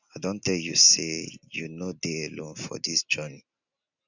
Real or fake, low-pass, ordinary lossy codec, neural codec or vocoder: real; 7.2 kHz; none; none